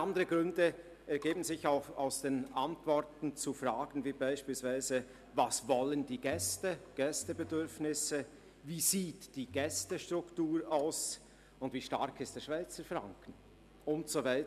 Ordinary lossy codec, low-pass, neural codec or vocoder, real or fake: none; 14.4 kHz; none; real